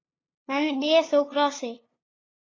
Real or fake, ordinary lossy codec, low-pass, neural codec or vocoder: fake; AAC, 32 kbps; 7.2 kHz; codec, 16 kHz, 2 kbps, FunCodec, trained on LibriTTS, 25 frames a second